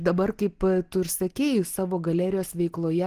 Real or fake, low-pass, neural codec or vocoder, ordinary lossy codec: real; 14.4 kHz; none; Opus, 16 kbps